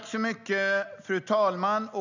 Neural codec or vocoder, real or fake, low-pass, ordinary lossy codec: none; real; 7.2 kHz; none